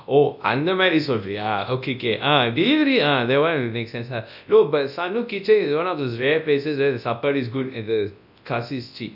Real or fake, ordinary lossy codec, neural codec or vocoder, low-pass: fake; none; codec, 24 kHz, 0.9 kbps, WavTokenizer, large speech release; 5.4 kHz